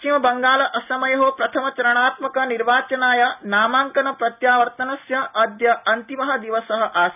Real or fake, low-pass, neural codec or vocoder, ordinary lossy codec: real; 3.6 kHz; none; none